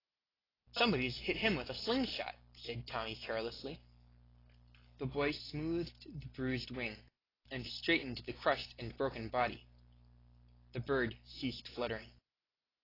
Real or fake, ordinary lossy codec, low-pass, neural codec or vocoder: real; AAC, 24 kbps; 5.4 kHz; none